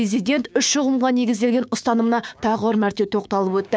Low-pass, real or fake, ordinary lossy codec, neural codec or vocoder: none; fake; none; codec, 16 kHz, 6 kbps, DAC